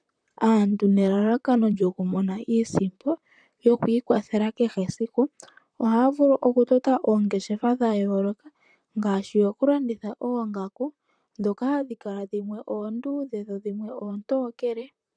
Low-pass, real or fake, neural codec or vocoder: 9.9 kHz; fake; vocoder, 44.1 kHz, 128 mel bands, Pupu-Vocoder